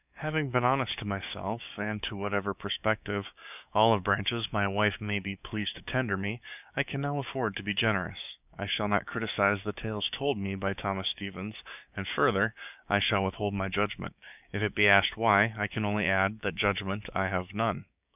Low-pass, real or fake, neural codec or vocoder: 3.6 kHz; fake; codec, 16 kHz, 6 kbps, DAC